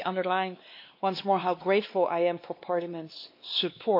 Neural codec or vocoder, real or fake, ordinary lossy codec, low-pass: codec, 16 kHz, 2 kbps, X-Codec, WavLM features, trained on Multilingual LibriSpeech; fake; MP3, 32 kbps; 5.4 kHz